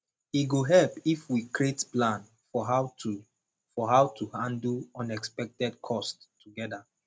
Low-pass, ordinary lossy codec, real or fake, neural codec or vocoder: none; none; real; none